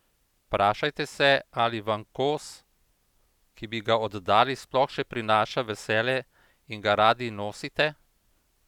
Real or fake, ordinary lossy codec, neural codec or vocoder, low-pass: real; none; none; 19.8 kHz